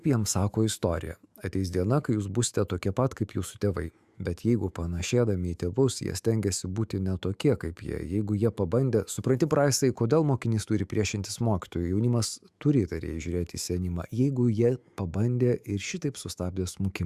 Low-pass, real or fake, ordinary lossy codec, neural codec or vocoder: 14.4 kHz; fake; Opus, 64 kbps; autoencoder, 48 kHz, 128 numbers a frame, DAC-VAE, trained on Japanese speech